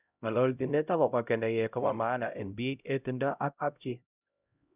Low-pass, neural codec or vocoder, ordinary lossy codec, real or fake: 3.6 kHz; codec, 16 kHz, 0.5 kbps, X-Codec, HuBERT features, trained on LibriSpeech; none; fake